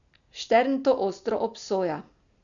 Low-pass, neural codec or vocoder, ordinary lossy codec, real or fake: 7.2 kHz; none; none; real